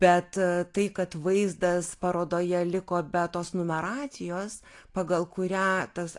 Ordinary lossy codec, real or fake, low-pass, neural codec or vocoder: AAC, 48 kbps; real; 10.8 kHz; none